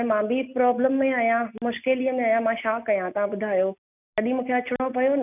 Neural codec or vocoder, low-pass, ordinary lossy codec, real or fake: none; 3.6 kHz; none; real